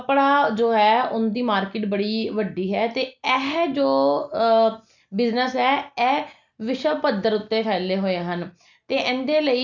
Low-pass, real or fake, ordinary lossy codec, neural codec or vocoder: 7.2 kHz; real; none; none